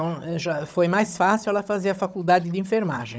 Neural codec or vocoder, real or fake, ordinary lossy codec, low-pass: codec, 16 kHz, 16 kbps, FunCodec, trained on Chinese and English, 50 frames a second; fake; none; none